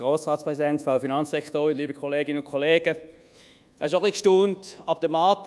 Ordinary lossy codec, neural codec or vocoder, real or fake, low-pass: none; codec, 24 kHz, 1.2 kbps, DualCodec; fake; none